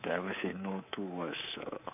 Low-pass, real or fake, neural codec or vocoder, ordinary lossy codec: 3.6 kHz; fake; codec, 24 kHz, 3.1 kbps, DualCodec; none